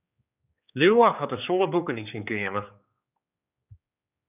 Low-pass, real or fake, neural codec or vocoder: 3.6 kHz; fake; codec, 16 kHz, 4 kbps, X-Codec, HuBERT features, trained on general audio